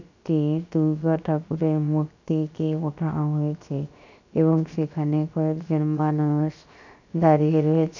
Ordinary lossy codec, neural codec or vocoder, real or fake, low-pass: none; codec, 16 kHz, 0.7 kbps, FocalCodec; fake; 7.2 kHz